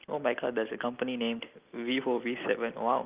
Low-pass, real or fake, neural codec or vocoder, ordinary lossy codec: 3.6 kHz; real; none; Opus, 24 kbps